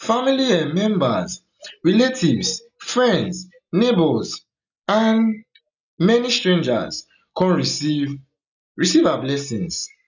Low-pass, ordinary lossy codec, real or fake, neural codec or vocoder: 7.2 kHz; none; real; none